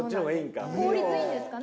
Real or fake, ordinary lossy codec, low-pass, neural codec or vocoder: real; none; none; none